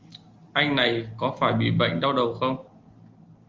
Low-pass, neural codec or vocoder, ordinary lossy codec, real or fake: 7.2 kHz; none; Opus, 32 kbps; real